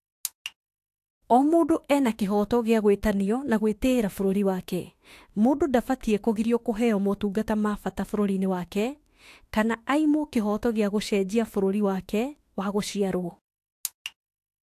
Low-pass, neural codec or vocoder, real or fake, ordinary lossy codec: 14.4 kHz; autoencoder, 48 kHz, 32 numbers a frame, DAC-VAE, trained on Japanese speech; fake; AAC, 64 kbps